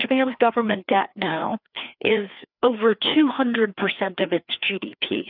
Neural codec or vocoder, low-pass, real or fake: codec, 16 kHz, 2 kbps, FreqCodec, larger model; 5.4 kHz; fake